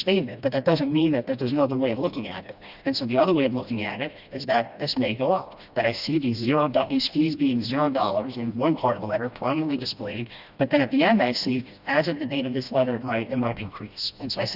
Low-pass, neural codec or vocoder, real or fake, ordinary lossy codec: 5.4 kHz; codec, 16 kHz, 1 kbps, FreqCodec, smaller model; fake; Opus, 64 kbps